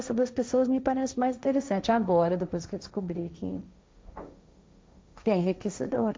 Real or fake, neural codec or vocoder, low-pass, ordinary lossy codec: fake; codec, 16 kHz, 1.1 kbps, Voila-Tokenizer; none; none